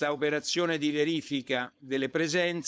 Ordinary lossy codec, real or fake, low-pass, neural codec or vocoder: none; fake; none; codec, 16 kHz, 4.8 kbps, FACodec